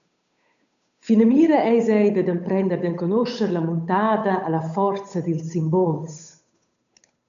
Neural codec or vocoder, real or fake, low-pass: codec, 16 kHz, 8 kbps, FunCodec, trained on Chinese and English, 25 frames a second; fake; 7.2 kHz